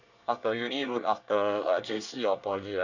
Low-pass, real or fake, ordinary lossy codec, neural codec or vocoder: 7.2 kHz; fake; none; codec, 24 kHz, 1 kbps, SNAC